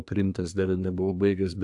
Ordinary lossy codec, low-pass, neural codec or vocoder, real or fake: AAC, 64 kbps; 10.8 kHz; codec, 24 kHz, 1 kbps, SNAC; fake